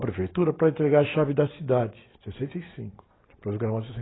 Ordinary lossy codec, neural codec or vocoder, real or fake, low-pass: AAC, 16 kbps; none; real; 7.2 kHz